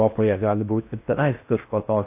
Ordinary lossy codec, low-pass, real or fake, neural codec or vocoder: AAC, 32 kbps; 3.6 kHz; fake; codec, 16 kHz in and 24 kHz out, 0.6 kbps, FocalCodec, streaming, 4096 codes